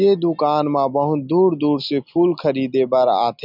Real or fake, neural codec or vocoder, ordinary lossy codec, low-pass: real; none; none; 5.4 kHz